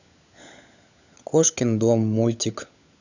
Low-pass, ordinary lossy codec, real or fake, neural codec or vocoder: 7.2 kHz; none; fake; codec, 16 kHz, 16 kbps, FunCodec, trained on LibriTTS, 50 frames a second